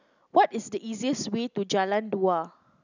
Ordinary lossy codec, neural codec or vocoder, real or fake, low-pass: none; none; real; 7.2 kHz